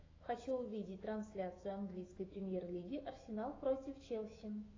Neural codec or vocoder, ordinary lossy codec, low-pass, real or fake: autoencoder, 48 kHz, 128 numbers a frame, DAC-VAE, trained on Japanese speech; AAC, 32 kbps; 7.2 kHz; fake